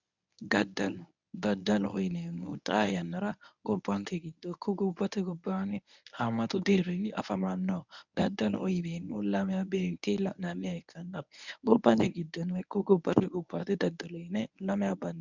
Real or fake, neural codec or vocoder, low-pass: fake; codec, 24 kHz, 0.9 kbps, WavTokenizer, medium speech release version 1; 7.2 kHz